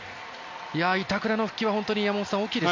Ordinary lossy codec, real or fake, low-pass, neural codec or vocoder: MP3, 48 kbps; real; 7.2 kHz; none